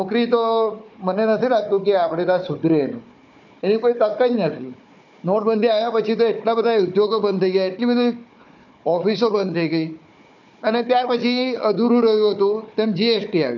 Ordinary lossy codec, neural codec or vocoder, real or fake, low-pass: none; codec, 16 kHz, 4 kbps, FunCodec, trained on Chinese and English, 50 frames a second; fake; 7.2 kHz